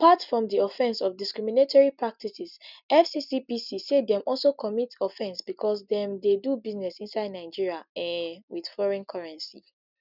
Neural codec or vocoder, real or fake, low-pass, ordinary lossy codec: none; real; 5.4 kHz; none